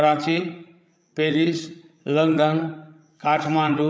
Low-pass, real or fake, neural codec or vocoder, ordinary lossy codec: none; fake; codec, 16 kHz, 16 kbps, FreqCodec, larger model; none